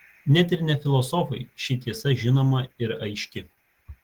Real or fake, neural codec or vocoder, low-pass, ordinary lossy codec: real; none; 19.8 kHz; Opus, 16 kbps